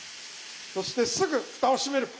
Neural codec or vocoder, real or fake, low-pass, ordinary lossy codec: none; real; none; none